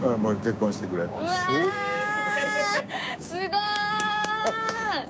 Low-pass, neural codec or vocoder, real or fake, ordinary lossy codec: none; codec, 16 kHz, 6 kbps, DAC; fake; none